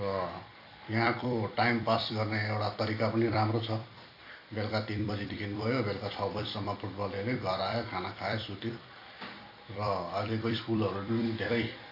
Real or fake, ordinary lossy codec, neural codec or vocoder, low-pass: fake; AAC, 32 kbps; vocoder, 44.1 kHz, 128 mel bands every 256 samples, BigVGAN v2; 5.4 kHz